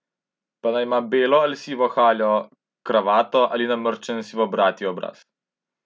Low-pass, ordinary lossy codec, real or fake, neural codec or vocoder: none; none; real; none